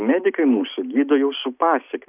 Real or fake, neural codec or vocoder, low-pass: real; none; 3.6 kHz